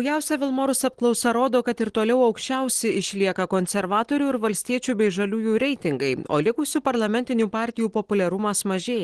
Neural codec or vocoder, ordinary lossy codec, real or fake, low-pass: none; Opus, 16 kbps; real; 10.8 kHz